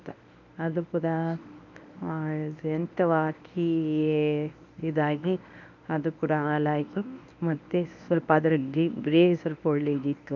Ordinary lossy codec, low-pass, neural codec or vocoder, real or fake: none; 7.2 kHz; codec, 24 kHz, 0.9 kbps, WavTokenizer, medium speech release version 1; fake